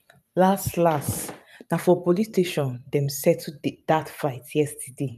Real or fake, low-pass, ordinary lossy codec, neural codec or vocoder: fake; 14.4 kHz; none; vocoder, 44.1 kHz, 128 mel bands every 512 samples, BigVGAN v2